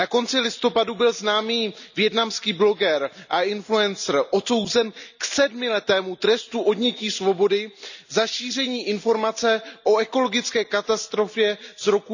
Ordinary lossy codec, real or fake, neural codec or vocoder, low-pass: none; real; none; 7.2 kHz